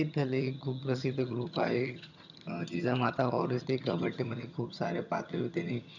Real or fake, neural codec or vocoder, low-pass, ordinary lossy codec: fake; vocoder, 22.05 kHz, 80 mel bands, HiFi-GAN; 7.2 kHz; none